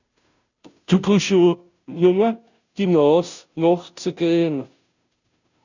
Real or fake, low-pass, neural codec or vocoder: fake; 7.2 kHz; codec, 16 kHz, 0.5 kbps, FunCodec, trained on Chinese and English, 25 frames a second